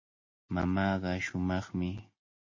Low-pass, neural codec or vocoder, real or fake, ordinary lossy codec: 7.2 kHz; none; real; MP3, 32 kbps